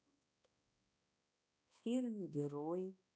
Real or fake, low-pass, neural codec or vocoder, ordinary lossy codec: fake; none; codec, 16 kHz, 1 kbps, X-Codec, HuBERT features, trained on balanced general audio; none